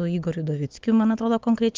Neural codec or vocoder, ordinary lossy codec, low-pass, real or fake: none; Opus, 24 kbps; 7.2 kHz; real